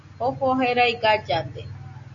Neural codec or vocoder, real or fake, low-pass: none; real; 7.2 kHz